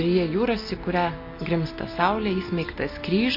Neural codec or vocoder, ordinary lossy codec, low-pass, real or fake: none; MP3, 32 kbps; 5.4 kHz; real